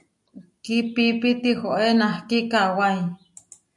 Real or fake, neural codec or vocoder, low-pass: real; none; 10.8 kHz